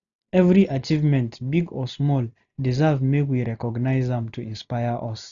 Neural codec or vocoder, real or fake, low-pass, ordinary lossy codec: none; real; 7.2 kHz; none